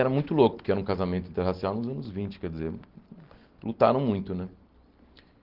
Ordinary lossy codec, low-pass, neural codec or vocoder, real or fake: Opus, 16 kbps; 5.4 kHz; none; real